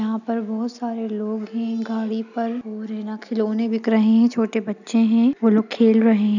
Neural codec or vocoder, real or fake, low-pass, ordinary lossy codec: none; real; 7.2 kHz; none